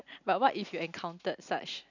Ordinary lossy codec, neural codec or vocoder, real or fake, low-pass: none; none; real; 7.2 kHz